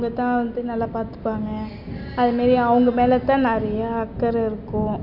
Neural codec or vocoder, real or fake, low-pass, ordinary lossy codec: none; real; 5.4 kHz; none